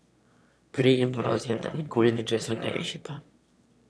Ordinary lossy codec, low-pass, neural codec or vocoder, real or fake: none; none; autoencoder, 22.05 kHz, a latent of 192 numbers a frame, VITS, trained on one speaker; fake